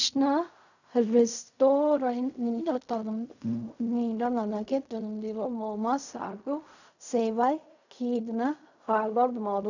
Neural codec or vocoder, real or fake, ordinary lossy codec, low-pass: codec, 16 kHz in and 24 kHz out, 0.4 kbps, LongCat-Audio-Codec, fine tuned four codebook decoder; fake; none; 7.2 kHz